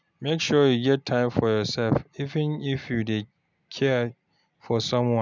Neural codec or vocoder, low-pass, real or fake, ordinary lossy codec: none; 7.2 kHz; real; none